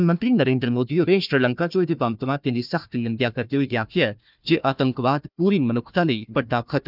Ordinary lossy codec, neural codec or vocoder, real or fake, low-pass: none; codec, 16 kHz, 1 kbps, FunCodec, trained on Chinese and English, 50 frames a second; fake; 5.4 kHz